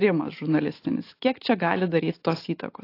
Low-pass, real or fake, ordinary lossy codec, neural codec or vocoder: 5.4 kHz; real; AAC, 32 kbps; none